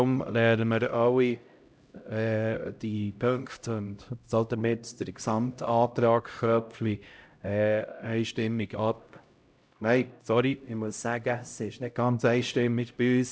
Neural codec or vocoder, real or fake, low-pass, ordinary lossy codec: codec, 16 kHz, 0.5 kbps, X-Codec, HuBERT features, trained on LibriSpeech; fake; none; none